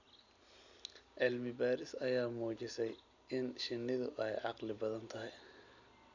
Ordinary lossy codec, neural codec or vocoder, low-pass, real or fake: none; none; 7.2 kHz; real